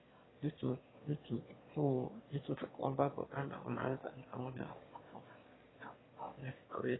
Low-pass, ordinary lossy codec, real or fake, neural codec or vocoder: 7.2 kHz; AAC, 16 kbps; fake; autoencoder, 22.05 kHz, a latent of 192 numbers a frame, VITS, trained on one speaker